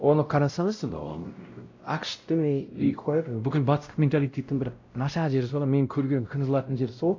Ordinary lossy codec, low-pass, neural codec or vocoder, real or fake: AAC, 48 kbps; 7.2 kHz; codec, 16 kHz, 0.5 kbps, X-Codec, WavLM features, trained on Multilingual LibriSpeech; fake